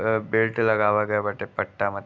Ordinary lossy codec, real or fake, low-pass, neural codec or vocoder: none; real; none; none